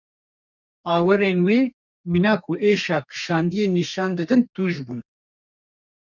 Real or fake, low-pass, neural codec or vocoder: fake; 7.2 kHz; codec, 32 kHz, 1.9 kbps, SNAC